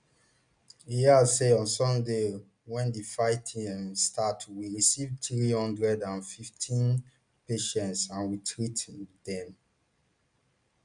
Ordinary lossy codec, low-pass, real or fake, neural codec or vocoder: none; 9.9 kHz; real; none